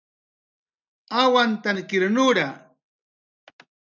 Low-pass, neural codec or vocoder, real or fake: 7.2 kHz; none; real